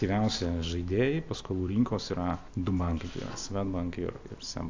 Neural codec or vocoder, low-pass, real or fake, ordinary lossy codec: none; 7.2 kHz; real; AAC, 48 kbps